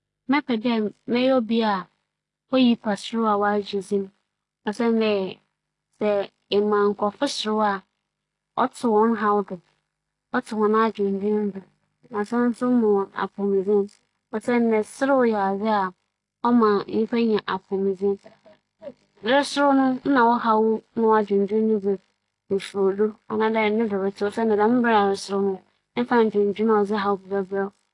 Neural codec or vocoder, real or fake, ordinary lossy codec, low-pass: none; real; AAC, 48 kbps; 10.8 kHz